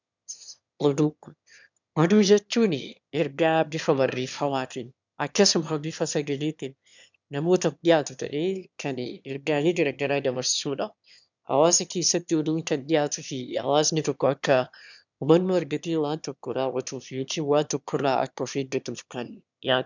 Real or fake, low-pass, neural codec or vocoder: fake; 7.2 kHz; autoencoder, 22.05 kHz, a latent of 192 numbers a frame, VITS, trained on one speaker